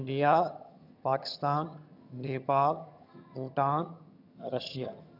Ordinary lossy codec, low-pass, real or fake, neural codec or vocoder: none; 5.4 kHz; fake; vocoder, 22.05 kHz, 80 mel bands, HiFi-GAN